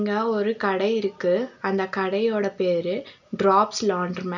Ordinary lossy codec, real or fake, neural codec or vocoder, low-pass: none; real; none; 7.2 kHz